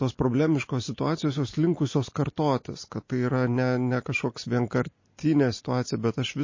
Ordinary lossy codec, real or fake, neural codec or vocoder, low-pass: MP3, 32 kbps; real; none; 7.2 kHz